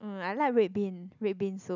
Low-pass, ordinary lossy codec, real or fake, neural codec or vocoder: 7.2 kHz; none; real; none